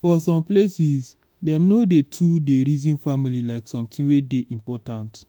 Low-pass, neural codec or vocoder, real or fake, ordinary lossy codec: none; autoencoder, 48 kHz, 32 numbers a frame, DAC-VAE, trained on Japanese speech; fake; none